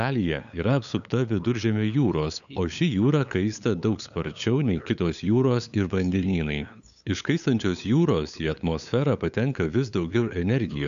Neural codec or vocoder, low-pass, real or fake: codec, 16 kHz, 8 kbps, FunCodec, trained on LibriTTS, 25 frames a second; 7.2 kHz; fake